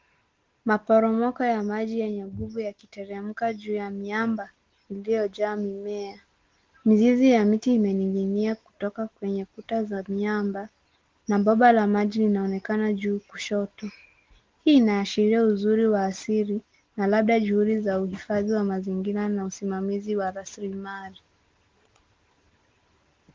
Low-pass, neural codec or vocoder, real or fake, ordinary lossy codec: 7.2 kHz; none; real; Opus, 16 kbps